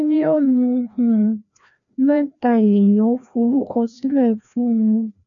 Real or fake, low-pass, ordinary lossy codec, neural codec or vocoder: fake; 7.2 kHz; none; codec, 16 kHz, 1 kbps, FreqCodec, larger model